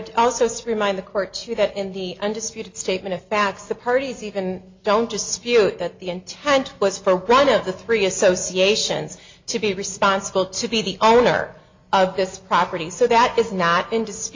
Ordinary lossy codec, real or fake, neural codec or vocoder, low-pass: MP3, 48 kbps; real; none; 7.2 kHz